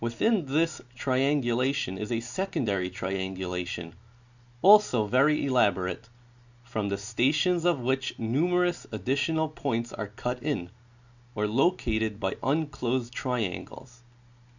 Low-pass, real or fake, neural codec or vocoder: 7.2 kHz; real; none